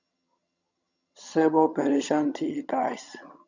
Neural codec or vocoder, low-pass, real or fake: vocoder, 22.05 kHz, 80 mel bands, HiFi-GAN; 7.2 kHz; fake